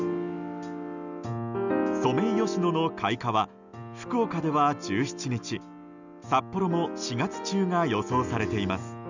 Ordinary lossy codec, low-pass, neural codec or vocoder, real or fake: none; 7.2 kHz; none; real